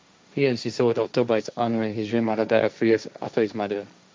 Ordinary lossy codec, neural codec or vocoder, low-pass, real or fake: none; codec, 16 kHz, 1.1 kbps, Voila-Tokenizer; none; fake